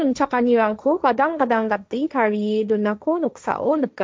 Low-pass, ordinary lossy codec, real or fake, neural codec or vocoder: none; none; fake; codec, 16 kHz, 1.1 kbps, Voila-Tokenizer